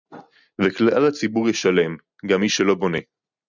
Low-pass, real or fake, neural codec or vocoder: 7.2 kHz; real; none